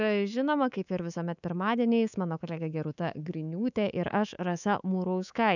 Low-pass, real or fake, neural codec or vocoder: 7.2 kHz; fake; codec, 24 kHz, 3.1 kbps, DualCodec